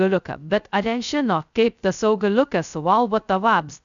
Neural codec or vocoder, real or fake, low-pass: codec, 16 kHz, 0.2 kbps, FocalCodec; fake; 7.2 kHz